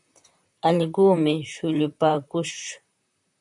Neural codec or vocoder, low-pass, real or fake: vocoder, 44.1 kHz, 128 mel bands, Pupu-Vocoder; 10.8 kHz; fake